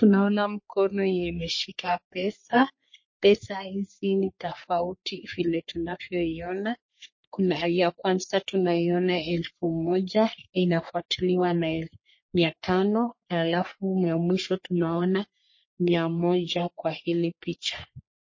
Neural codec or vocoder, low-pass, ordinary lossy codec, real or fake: codec, 44.1 kHz, 3.4 kbps, Pupu-Codec; 7.2 kHz; MP3, 32 kbps; fake